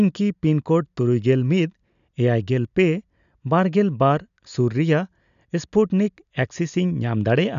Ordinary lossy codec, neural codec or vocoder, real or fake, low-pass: none; none; real; 7.2 kHz